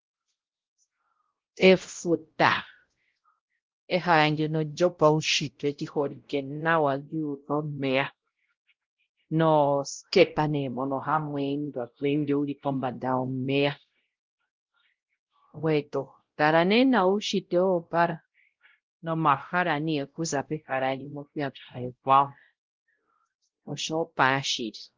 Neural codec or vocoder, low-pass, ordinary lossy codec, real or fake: codec, 16 kHz, 0.5 kbps, X-Codec, WavLM features, trained on Multilingual LibriSpeech; 7.2 kHz; Opus, 16 kbps; fake